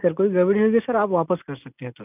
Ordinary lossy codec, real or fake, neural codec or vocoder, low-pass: none; real; none; 3.6 kHz